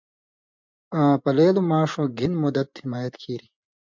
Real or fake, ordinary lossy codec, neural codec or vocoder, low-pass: fake; MP3, 64 kbps; vocoder, 24 kHz, 100 mel bands, Vocos; 7.2 kHz